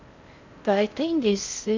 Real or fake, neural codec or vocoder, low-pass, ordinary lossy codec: fake; codec, 16 kHz in and 24 kHz out, 0.6 kbps, FocalCodec, streaming, 4096 codes; 7.2 kHz; MP3, 64 kbps